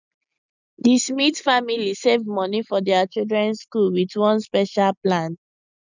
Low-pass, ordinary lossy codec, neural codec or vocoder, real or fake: 7.2 kHz; none; none; real